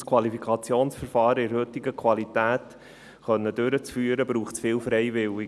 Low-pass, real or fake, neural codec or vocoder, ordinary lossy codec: none; real; none; none